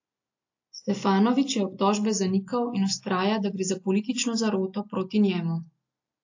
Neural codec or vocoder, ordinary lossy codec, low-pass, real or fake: none; AAC, 48 kbps; 7.2 kHz; real